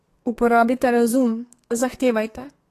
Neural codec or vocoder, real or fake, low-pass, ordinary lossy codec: codec, 32 kHz, 1.9 kbps, SNAC; fake; 14.4 kHz; AAC, 48 kbps